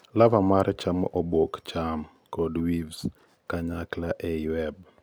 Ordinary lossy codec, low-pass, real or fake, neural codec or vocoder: none; none; real; none